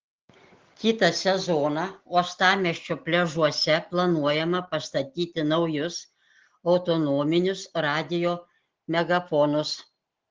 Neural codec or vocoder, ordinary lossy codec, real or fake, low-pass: none; Opus, 16 kbps; real; 7.2 kHz